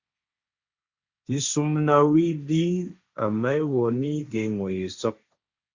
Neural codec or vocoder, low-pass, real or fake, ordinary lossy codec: codec, 16 kHz, 1.1 kbps, Voila-Tokenizer; 7.2 kHz; fake; Opus, 64 kbps